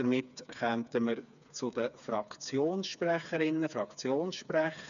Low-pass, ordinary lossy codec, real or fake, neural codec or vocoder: 7.2 kHz; none; fake; codec, 16 kHz, 4 kbps, FreqCodec, smaller model